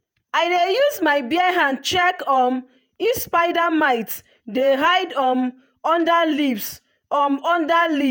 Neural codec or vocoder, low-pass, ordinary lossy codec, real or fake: vocoder, 48 kHz, 128 mel bands, Vocos; none; none; fake